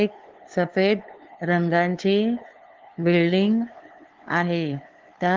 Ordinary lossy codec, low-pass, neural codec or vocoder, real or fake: Opus, 16 kbps; 7.2 kHz; codec, 16 kHz, 2 kbps, FunCodec, trained on LibriTTS, 25 frames a second; fake